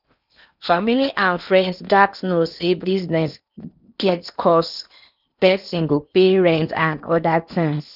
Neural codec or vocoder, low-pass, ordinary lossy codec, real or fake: codec, 16 kHz in and 24 kHz out, 0.8 kbps, FocalCodec, streaming, 65536 codes; 5.4 kHz; none; fake